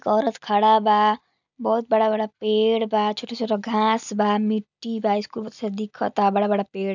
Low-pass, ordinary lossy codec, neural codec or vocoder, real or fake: 7.2 kHz; none; none; real